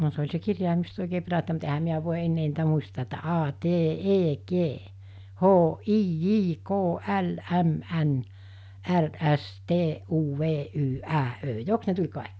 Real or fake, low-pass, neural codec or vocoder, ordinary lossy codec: real; none; none; none